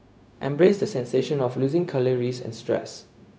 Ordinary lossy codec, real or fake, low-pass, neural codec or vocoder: none; fake; none; codec, 16 kHz, 0.4 kbps, LongCat-Audio-Codec